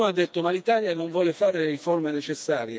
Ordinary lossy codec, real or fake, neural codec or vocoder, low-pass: none; fake; codec, 16 kHz, 2 kbps, FreqCodec, smaller model; none